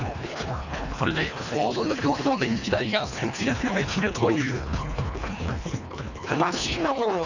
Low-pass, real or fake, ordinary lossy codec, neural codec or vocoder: 7.2 kHz; fake; none; codec, 24 kHz, 1.5 kbps, HILCodec